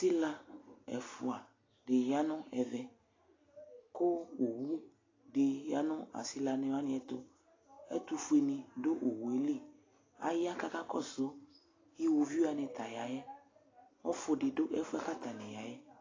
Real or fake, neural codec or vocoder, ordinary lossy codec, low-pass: real; none; AAC, 32 kbps; 7.2 kHz